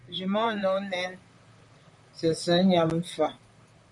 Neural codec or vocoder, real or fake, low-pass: vocoder, 44.1 kHz, 128 mel bands, Pupu-Vocoder; fake; 10.8 kHz